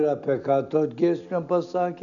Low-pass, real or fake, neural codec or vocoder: 7.2 kHz; real; none